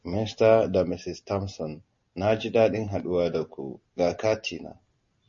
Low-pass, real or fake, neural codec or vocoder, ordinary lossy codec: 7.2 kHz; real; none; MP3, 32 kbps